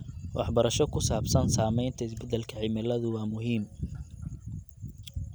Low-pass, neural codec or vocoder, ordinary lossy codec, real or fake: none; none; none; real